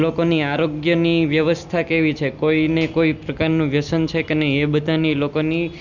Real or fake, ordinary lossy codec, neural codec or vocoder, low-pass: real; none; none; 7.2 kHz